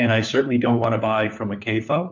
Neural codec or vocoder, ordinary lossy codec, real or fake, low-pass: codec, 16 kHz, 4 kbps, FunCodec, trained on LibriTTS, 50 frames a second; MP3, 64 kbps; fake; 7.2 kHz